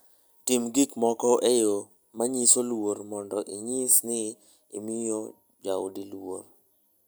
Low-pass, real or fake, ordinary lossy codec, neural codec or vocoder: none; real; none; none